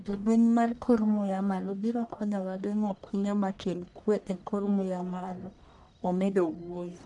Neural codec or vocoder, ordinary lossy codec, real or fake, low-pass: codec, 44.1 kHz, 1.7 kbps, Pupu-Codec; none; fake; 10.8 kHz